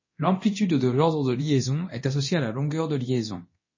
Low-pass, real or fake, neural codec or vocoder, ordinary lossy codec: 7.2 kHz; fake; codec, 24 kHz, 0.9 kbps, DualCodec; MP3, 32 kbps